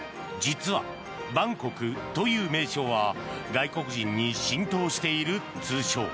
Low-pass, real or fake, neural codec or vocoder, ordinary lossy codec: none; real; none; none